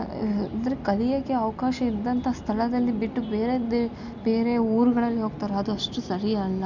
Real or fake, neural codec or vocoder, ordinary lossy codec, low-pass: real; none; none; 7.2 kHz